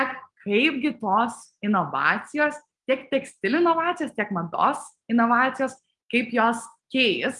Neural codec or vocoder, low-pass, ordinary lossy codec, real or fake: none; 10.8 kHz; Opus, 32 kbps; real